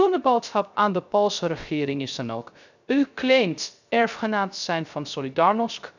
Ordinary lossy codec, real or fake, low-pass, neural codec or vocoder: none; fake; 7.2 kHz; codec, 16 kHz, 0.3 kbps, FocalCodec